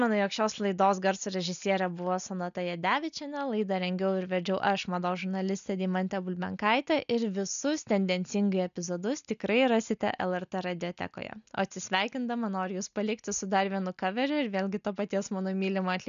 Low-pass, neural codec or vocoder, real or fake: 7.2 kHz; none; real